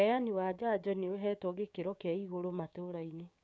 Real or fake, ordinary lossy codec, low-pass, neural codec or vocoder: fake; none; none; codec, 16 kHz, 6 kbps, DAC